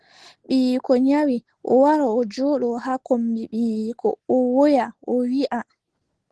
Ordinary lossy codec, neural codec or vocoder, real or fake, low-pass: Opus, 16 kbps; none; real; 10.8 kHz